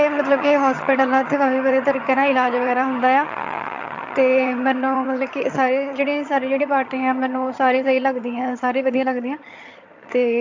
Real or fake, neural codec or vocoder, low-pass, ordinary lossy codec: fake; vocoder, 22.05 kHz, 80 mel bands, HiFi-GAN; 7.2 kHz; AAC, 48 kbps